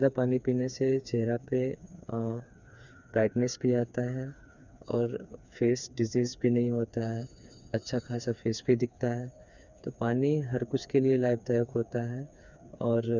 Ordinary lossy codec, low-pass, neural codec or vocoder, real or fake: none; 7.2 kHz; codec, 16 kHz, 4 kbps, FreqCodec, smaller model; fake